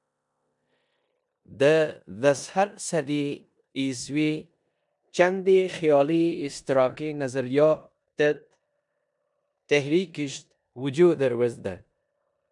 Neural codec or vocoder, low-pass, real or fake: codec, 16 kHz in and 24 kHz out, 0.9 kbps, LongCat-Audio-Codec, four codebook decoder; 10.8 kHz; fake